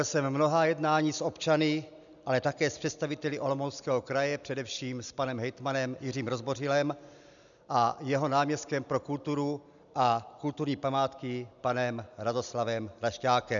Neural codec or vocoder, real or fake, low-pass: none; real; 7.2 kHz